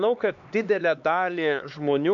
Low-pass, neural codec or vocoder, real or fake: 7.2 kHz; codec, 16 kHz, 2 kbps, X-Codec, HuBERT features, trained on LibriSpeech; fake